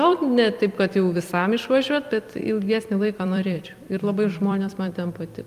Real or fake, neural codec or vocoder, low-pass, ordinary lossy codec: fake; vocoder, 44.1 kHz, 128 mel bands every 256 samples, BigVGAN v2; 14.4 kHz; Opus, 32 kbps